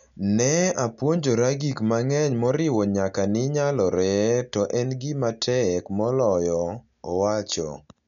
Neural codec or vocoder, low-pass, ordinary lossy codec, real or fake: none; 7.2 kHz; none; real